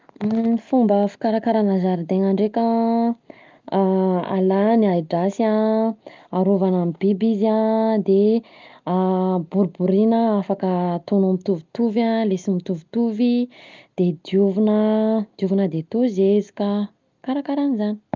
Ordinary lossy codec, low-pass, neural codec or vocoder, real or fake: Opus, 24 kbps; 7.2 kHz; none; real